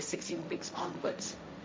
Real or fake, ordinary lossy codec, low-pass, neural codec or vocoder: fake; none; none; codec, 16 kHz, 1.1 kbps, Voila-Tokenizer